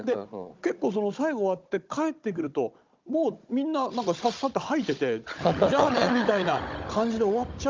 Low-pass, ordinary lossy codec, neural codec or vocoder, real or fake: 7.2 kHz; Opus, 32 kbps; codec, 16 kHz, 16 kbps, FunCodec, trained on Chinese and English, 50 frames a second; fake